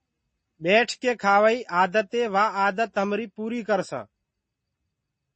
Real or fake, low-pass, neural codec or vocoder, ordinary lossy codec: real; 10.8 kHz; none; MP3, 32 kbps